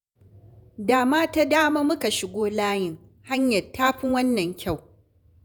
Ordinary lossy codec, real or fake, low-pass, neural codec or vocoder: none; fake; none; vocoder, 48 kHz, 128 mel bands, Vocos